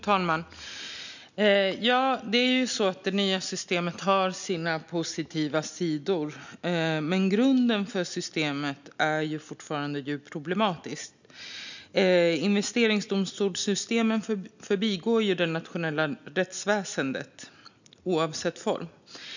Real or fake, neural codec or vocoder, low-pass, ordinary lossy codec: real; none; 7.2 kHz; none